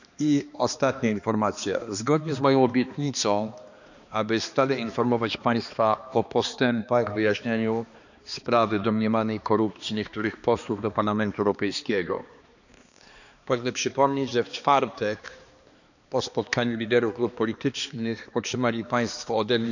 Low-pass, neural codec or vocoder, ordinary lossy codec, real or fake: 7.2 kHz; codec, 16 kHz, 2 kbps, X-Codec, HuBERT features, trained on balanced general audio; none; fake